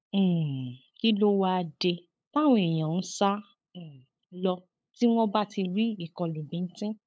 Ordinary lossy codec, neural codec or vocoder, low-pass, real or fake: none; codec, 16 kHz, 8 kbps, FunCodec, trained on LibriTTS, 25 frames a second; none; fake